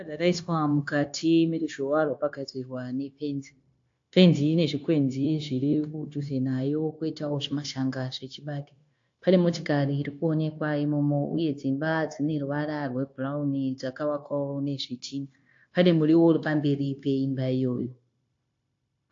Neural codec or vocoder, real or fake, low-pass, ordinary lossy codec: codec, 16 kHz, 0.9 kbps, LongCat-Audio-Codec; fake; 7.2 kHz; AAC, 48 kbps